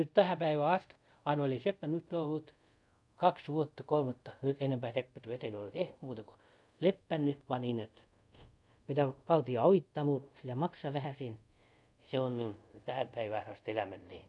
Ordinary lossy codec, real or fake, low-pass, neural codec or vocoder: none; fake; 10.8 kHz; codec, 24 kHz, 0.5 kbps, DualCodec